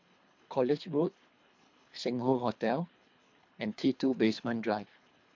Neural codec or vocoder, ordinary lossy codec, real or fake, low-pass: codec, 24 kHz, 3 kbps, HILCodec; MP3, 48 kbps; fake; 7.2 kHz